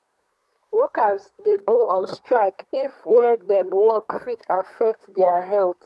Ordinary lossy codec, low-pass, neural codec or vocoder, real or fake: none; none; codec, 24 kHz, 1 kbps, SNAC; fake